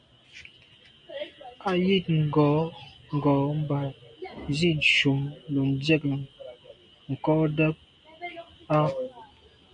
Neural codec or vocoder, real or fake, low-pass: none; real; 9.9 kHz